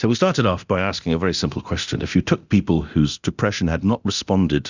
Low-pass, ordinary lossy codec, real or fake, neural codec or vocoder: 7.2 kHz; Opus, 64 kbps; fake; codec, 24 kHz, 0.9 kbps, DualCodec